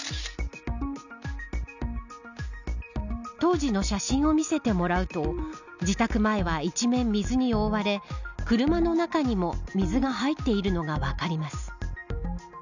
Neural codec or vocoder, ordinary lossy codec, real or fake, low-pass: none; none; real; 7.2 kHz